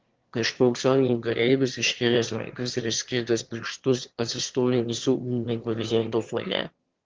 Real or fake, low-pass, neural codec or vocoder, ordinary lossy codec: fake; 7.2 kHz; autoencoder, 22.05 kHz, a latent of 192 numbers a frame, VITS, trained on one speaker; Opus, 16 kbps